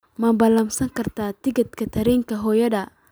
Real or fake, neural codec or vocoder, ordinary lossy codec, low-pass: real; none; none; none